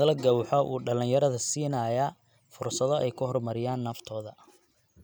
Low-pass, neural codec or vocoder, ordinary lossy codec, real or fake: none; none; none; real